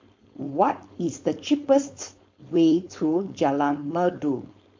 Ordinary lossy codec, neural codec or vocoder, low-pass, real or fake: MP3, 48 kbps; codec, 16 kHz, 4.8 kbps, FACodec; 7.2 kHz; fake